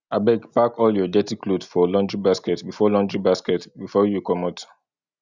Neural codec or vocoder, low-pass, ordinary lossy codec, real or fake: none; 7.2 kHz; none; real